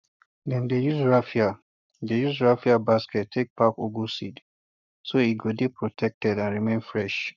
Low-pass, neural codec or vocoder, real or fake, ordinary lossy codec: 7.2 kHz; codec, 44.1 kHz, 7.8 kbps, Pupu-Codec; fake; none